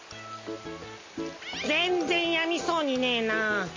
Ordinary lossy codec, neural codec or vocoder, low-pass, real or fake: MP3, 32 kbps; none; 7.2 kHz; real